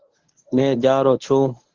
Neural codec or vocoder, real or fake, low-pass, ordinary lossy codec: codec, 24 kHz, 0.9 kbps, WavTokenizer, medium speech release version 1; fake; 7.2 kHz; Opus, 16 kbps